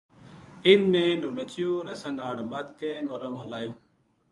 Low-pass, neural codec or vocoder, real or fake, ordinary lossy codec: 10.8 kHz; codec, 24 kHz, 0.9 kbps, WavTokenizer, medium speech release version 1; fake; MP3, 64 kbps